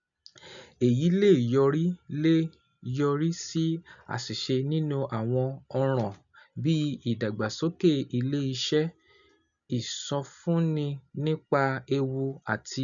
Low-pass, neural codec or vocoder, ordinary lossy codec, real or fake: 7.2 kHz; none; none; real